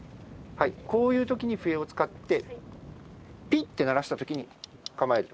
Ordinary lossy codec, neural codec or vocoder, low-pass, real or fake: none; none; none; real